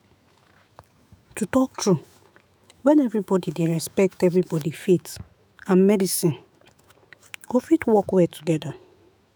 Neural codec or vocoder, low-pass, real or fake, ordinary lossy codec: autoencoder, 48 kHz, 128 numbers a frame, DAC-VAE, trained on Japanese speech; none; fake; none